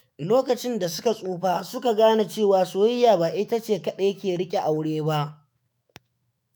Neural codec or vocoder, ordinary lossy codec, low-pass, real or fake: autoencoder, 48 kHz, 128 numbers a frame, DAC-VAE, trained on Japanese speech; none; none; fake